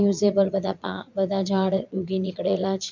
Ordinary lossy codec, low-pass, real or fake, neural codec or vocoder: MP3, 64 kbps; 7.2 kHz; fake; vocoder, 22.05 kHz, 80 mel bands, Vocos